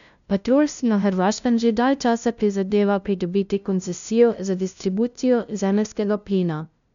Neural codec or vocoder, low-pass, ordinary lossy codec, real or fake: codec, 16 kHz, 0.5 kbps, FunCodec, trained on LibriTTS, 25 frames a second; 7.2 kHz; none; fake